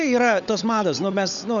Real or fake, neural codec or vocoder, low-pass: fake; codec, 16 kHz, 16 kbps, FunCodec, trained on LibriTTS, 50 frames a second; 7.2 kHz